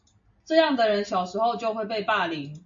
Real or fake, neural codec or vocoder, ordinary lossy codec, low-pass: real; none; AAC, 64 kbps; 7.2 kHz